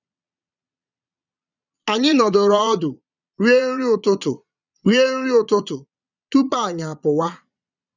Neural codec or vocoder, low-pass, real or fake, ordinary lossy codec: vocoder, 22.05 kHz, 80 mel bands, Vocos; 7.2 kHz; fake; none